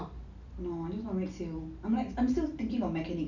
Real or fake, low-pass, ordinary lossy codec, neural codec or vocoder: real; 7.2 kHz; none; none